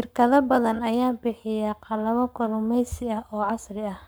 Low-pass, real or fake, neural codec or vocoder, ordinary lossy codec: none; fake; codec, 44.1 kHz, 7.8 kbps, Pupu-Codec; none